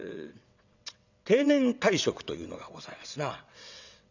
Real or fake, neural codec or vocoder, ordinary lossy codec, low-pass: fake; vocoder, 22.05 kHz, 80 mel bands, WaveNeXt; none; 7.2 kHz